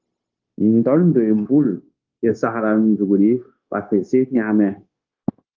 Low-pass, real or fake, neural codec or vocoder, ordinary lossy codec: 7.2 kHz; fake; codec, 16 kHz, 0.9 kbps, LongCat-Audio-Codec; Opus, 32 kbps